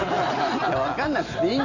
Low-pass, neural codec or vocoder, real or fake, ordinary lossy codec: 7.2 kHz; none; real; none